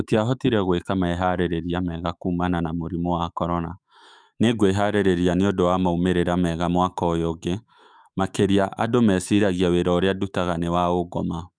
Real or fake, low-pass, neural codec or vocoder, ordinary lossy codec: fake; 9.9 kHz; autoencoder, 48 kHz, 128 numbers a frame, DAC-VAE, trained on Japanese speech; none